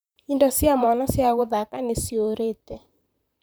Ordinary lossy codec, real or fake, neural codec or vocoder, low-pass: none; fake; vocoder, 44.1 kHz, 128 mel bands, Pupu-Vocoder; none